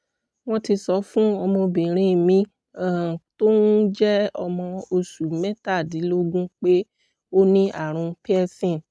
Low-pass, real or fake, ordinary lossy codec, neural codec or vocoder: none; real; none; none